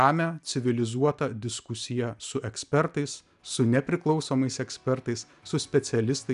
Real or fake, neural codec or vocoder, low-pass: real; none; 10.8 kHz